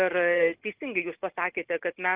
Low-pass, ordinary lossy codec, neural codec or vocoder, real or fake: 3.6 kHz; Opus, 24 kbps; none; real